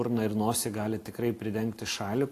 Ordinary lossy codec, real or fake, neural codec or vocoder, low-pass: AAC, 48 kbps; real; none; 14.4 kHz